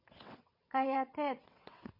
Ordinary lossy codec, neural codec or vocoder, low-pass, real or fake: MP3, 32 kbps; vocoder, 22.05 kHz, 80 mel bands, WaveNeXt; 5.4 kHz; fake